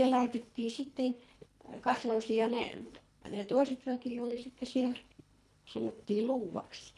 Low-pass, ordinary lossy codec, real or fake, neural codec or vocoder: none; none; fake; codec, 24 kHz, 1.5 kbps, HILCodec